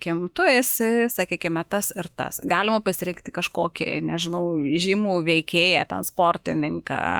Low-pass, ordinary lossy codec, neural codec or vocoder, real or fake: 19.8 kHz; Opus, 64 kbps; autoencoder, 48 kHz, 32 numbers a frame, DAC-VAE, trained on Japanese speech; fake